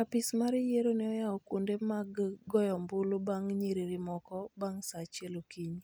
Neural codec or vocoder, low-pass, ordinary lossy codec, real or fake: none; none; none; real